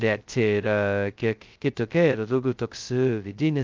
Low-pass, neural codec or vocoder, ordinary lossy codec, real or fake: 7.2 kHz; codec, 16 kHz, 0.2 kbps, FocalCodec; Opus, 32 kbps; fake